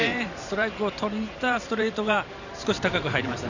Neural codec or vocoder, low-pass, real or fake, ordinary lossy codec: vocoder, 44.1 kHz, 128 mel bands every 512 samples, BigVGAN v2; 7.2 kHz; fake; none